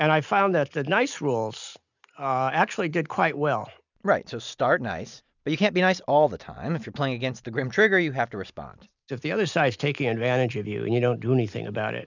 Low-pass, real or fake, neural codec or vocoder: 7.2 kHz; real; none